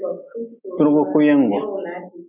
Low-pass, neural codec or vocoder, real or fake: 3.6 kHz; none; real